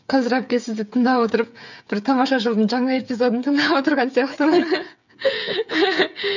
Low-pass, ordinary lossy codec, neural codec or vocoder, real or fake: 7.2 kHz; none; codec, 16 kHz, 8 kbps, FreqCodec, smaller model; fake